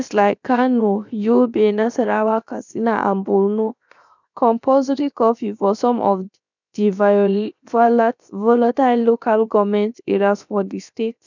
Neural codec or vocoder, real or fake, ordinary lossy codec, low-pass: codec, 16 kHz, 0.7 kbps, FocalCodec; fake; none; 7.2 kHz